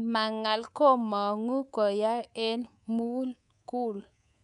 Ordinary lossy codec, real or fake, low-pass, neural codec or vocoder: none; fake; 10.8 kHz; codec, 24 kHz, 3.1 kbps, DualCodec